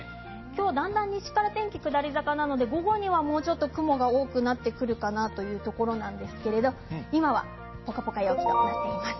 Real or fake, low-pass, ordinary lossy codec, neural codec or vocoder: real; 7.2 kHz; MP3, 24 kbps; none